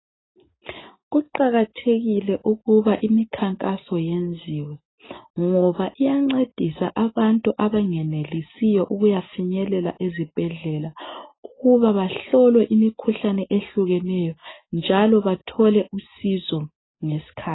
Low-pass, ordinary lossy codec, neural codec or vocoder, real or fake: 7.2 kHz; AAC, 16 kbps; none; real